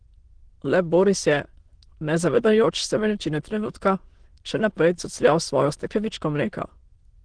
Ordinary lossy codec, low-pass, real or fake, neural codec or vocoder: Opus, 16 kbps; 9.9 kHz; fake; autoencoder, 22.05 kHz, a latent of 192 numbers a frame, VITS, trained on many speakers